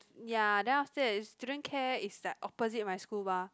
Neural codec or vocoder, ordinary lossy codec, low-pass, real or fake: none; none; none; real